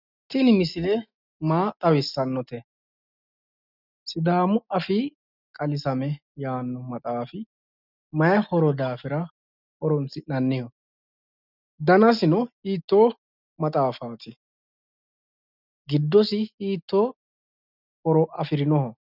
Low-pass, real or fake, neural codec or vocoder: 5.4 kHz; real; none